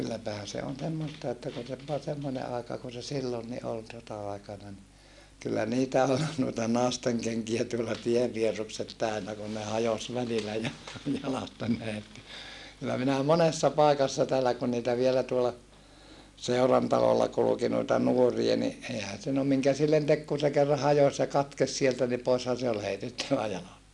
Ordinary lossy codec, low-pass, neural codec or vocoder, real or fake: none; none; none; real